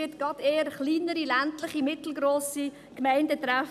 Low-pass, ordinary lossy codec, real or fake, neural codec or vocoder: 14.4 kHz; none; real; none